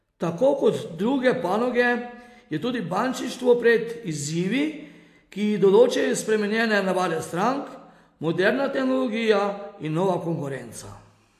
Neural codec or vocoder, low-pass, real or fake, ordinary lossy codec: none; 14.4 kHz; real; AAC, 64 kbps